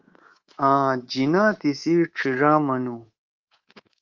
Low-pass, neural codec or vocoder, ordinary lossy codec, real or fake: 7.2 kHz; codec, 24 kHz, 3.1 kbps, DualCodec; Opus, 32 kbps; fake